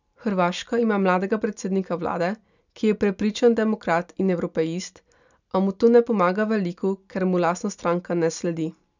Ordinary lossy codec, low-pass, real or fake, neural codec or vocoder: none; 7.2 kHz; real; none